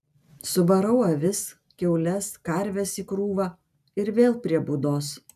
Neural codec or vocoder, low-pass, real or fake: none; 14.4 kHz; real